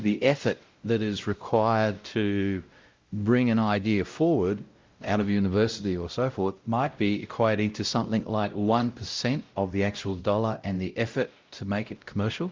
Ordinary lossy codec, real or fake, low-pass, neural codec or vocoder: Opus, 24 kbps; fake; 7.2 kHz; codec, 16 kHz, 0.5 kbps, X-Codec, WavLM features, trained on Multilingual LibriSpeech